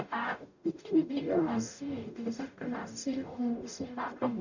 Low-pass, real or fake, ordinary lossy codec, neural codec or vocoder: 7.2 kHz; fake; none; codec, 44.1 kHz, 0.9 kbps, DAC